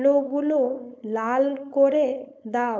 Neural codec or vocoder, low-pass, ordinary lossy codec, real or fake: codec, 16 kHz, 4.8 kbps, FACodec; none; none; fake